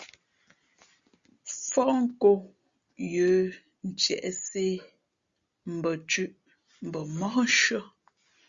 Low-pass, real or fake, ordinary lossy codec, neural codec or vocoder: 7.2 kHz; real; Opus, 64 kbps; none